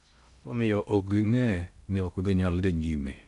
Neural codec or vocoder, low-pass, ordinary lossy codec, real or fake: codec, 16 kHz in and 24 kHz out, 0.6 kbps, FocalCodec, streaming, 2048 codes; 10.8 kHz; none; fake